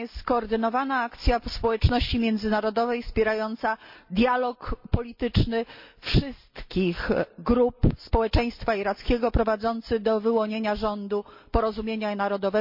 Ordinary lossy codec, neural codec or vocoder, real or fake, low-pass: MP3, 48 kbps; none; real; 5.4 kHz